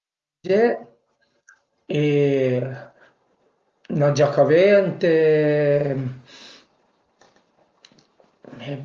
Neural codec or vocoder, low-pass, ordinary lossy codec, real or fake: none; 7.2 kHz; Opus, 32 kbps; real